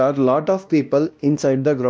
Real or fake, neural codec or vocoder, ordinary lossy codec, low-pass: fake; codec, 16 kHz, 1 kbps, X-Codec, WavLM features, trained on Multilingual LibriSpeech; none; none